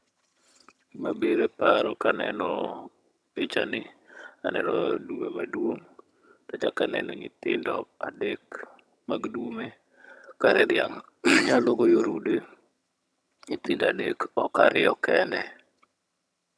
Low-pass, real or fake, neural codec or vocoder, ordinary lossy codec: none; fake; vocoder, 22.05 kHz, 80 mel bands, HiFi-GAN; none